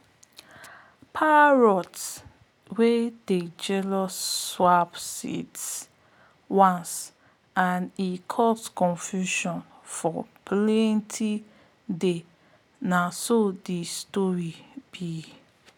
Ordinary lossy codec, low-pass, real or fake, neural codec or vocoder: none; none; real; none